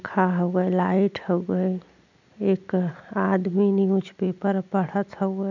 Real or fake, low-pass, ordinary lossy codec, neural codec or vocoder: real; 7.2 kHz; none; none